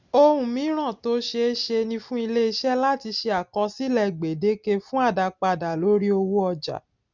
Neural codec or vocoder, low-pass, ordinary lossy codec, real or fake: none; 7.2 kHz; none; real